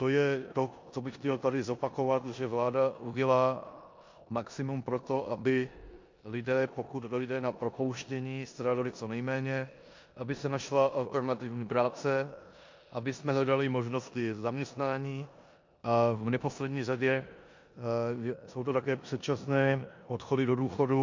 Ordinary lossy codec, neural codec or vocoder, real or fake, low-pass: MP3, 48 kbps; codec, 16 kHz in and 24 kHz out, 0.9 kbps, LongCat-Audio-Codec, four codebook decoder; fake; 7.2 kHz